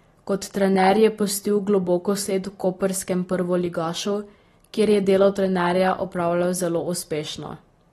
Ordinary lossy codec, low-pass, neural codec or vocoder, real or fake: AAC, 32 kbps; 19.8 kHz; none; real